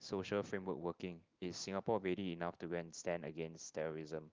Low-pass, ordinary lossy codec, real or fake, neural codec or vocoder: 7.2 kHz; Opus, 32 kbps; real; none